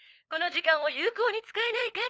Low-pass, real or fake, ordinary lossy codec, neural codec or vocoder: none; fake; none; codec, 16 kHz, 4 kbps, FunCodec, trained on LibriTTS, 50 frames a second